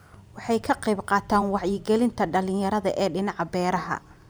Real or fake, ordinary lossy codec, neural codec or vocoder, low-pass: fake; none; vocoder, 44.1 kHz, 128 mel bands every 512 samples, BigVGAN v2; none